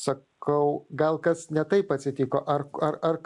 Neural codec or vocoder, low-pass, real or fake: none; 10.8 kHz; real